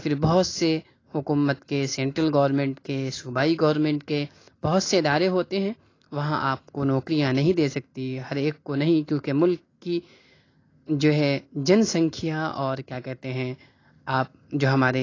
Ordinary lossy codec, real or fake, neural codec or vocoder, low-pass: AAC, 32 kbps; real; none; 7.2 kHz